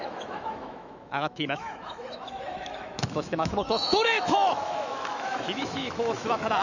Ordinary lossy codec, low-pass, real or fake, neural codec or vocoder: none; 7.2 kHz; fake; autoencoder, 48 kHz, 128 numbers a frame, DAC-VAE, trained on Japanese speech